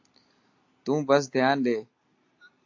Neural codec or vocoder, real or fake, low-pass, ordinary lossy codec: none; real; 7.2 kHz; AAC, 48 kbps